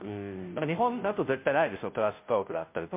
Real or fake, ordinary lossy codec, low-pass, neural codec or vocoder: fake; MP3, 32 kbps; 3.6 kHz; codec, 16 kHz, 0.5 kbps, FunCodec, trained on Chinese and English, 25 frames a second